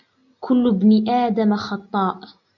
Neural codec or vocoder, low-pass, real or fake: none; 7.2 kHz; real